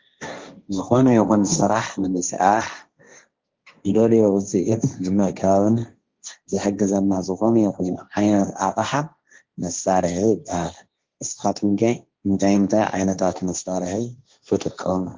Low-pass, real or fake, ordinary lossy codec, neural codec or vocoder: 7.2 kHz; fake; Opus, 32 kbps; codec, 16 kHz, 1.1 kbps, Voila-Tokenizer